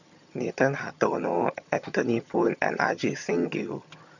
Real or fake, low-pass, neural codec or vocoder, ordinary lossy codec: fake; 7.2 kHz; vocoder, 22.05 kHz, 80 mel bands, HiFi-GAN; none